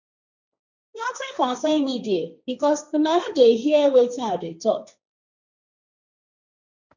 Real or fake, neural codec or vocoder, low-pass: fake; codec, 16 kHz, 1.1 kbps, Voila-Tokenizer; 7.2 kHz